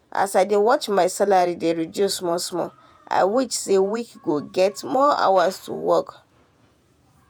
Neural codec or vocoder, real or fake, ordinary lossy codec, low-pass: vocoder, 48 kHz, 128 mel bands, Vocos; fake; none; none